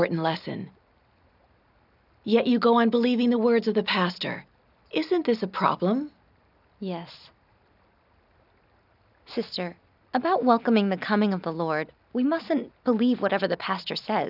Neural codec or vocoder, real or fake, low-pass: none; real; 5.4 kHz